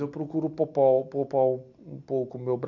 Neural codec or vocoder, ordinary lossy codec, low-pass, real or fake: none; none; 7.2 kHz; real